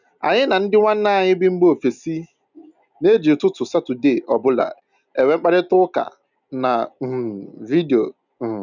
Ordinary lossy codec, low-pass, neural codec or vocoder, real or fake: none; 7.2 kHz; none; real